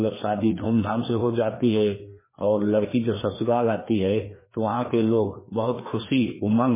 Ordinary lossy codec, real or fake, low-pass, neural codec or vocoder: MP3, 16 kbps; fake; 3.6 kHz; codec, 16 kHz, 2 kbps, FreqCodec, larger model